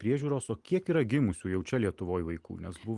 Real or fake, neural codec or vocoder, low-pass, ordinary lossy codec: real; none; 10.8 kHz; Opus, 24 kbps